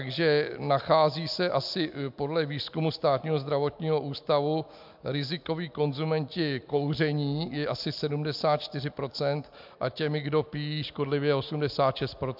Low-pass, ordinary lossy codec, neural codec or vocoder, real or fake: 5.4 kHz; MP3, 48 kbps; none; real